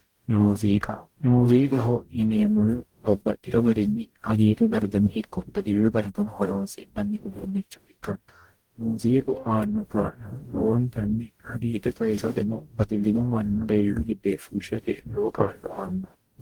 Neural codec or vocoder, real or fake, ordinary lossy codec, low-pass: codec, 44.1 kHz, 0.9 kbps, DAC; fake; Opus, 24 kbps; 19.8 kHz